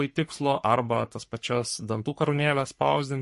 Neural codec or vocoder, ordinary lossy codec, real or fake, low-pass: codec, 44.1 kHz, 3.4 kbps, Pupu-Codec; MP3, 48 kbps; fake; 14.4 kHz